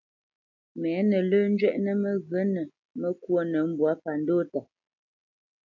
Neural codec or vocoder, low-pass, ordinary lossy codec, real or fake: none; 7.2 kHz; AAC, 48 kbps; real